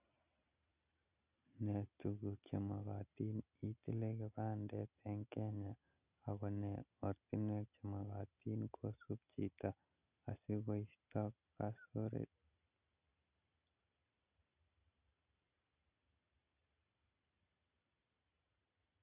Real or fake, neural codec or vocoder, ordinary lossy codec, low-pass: real; none; none; 3.6 kHz